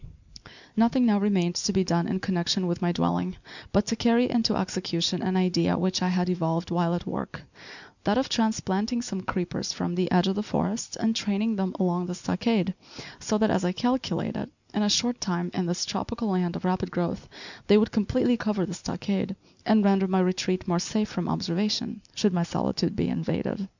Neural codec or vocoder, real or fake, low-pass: none; real; 7.2 kHz